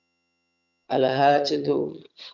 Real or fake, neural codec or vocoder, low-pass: fake; vocoder, 22.05 kHz, 80 mel bands, HiFi-GAN; 7.2 kHz